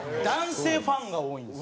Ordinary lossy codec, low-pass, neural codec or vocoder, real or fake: none; none; none; real